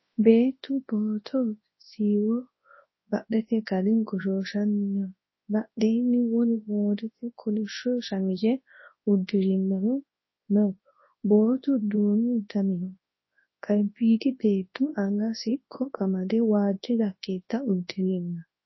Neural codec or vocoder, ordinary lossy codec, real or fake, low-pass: codec, 24 kHz, 0.9 kbps, WavTokenizer, large speech release; MP3, 24 kbps; fake; 7.2 kHz